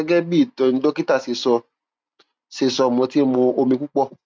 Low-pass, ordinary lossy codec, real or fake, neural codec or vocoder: none; none; real; none